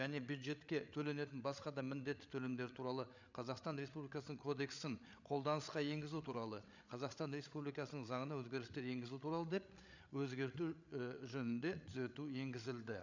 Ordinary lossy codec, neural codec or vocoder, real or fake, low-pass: none; codec, 16 kHz, 8 kbps, FreqCodec, larger model; fake; 7.2 kHz